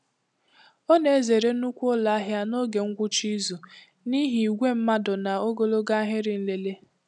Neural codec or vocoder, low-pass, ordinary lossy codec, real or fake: none; 10.8 kHz; none; real